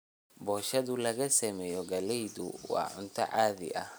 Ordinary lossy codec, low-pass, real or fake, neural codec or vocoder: none; none; fake; vocoder, 44.1 kHz, 128 mel bands every 256 samples, BigVGAN v2